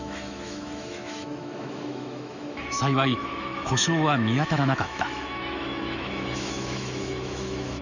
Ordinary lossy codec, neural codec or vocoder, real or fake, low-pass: none; autoencoder, 48 kHz, 128 numbers a frame, DAC-VAE, trained on Japanese speech; fake; 7.2 kHz